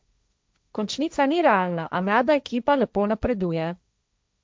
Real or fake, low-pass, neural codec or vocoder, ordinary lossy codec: fake; none; codec, 16 kHz, 1.1 kbps, Voila-Tokenizer; none